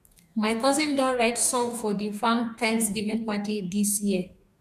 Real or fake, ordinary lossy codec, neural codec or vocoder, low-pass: fake; none; codec, 44.1 kHz, 2.6 kbps, DAC; 14.4 kHz